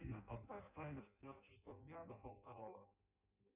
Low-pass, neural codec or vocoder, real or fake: 3.6 kHz; codec, 16 kHz in and 24 kHz out, 0.6 kbps, FireRedTTS-2 codec; fake